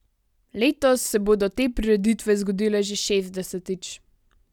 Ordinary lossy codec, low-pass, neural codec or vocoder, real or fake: none; 19.8 kHz; none; real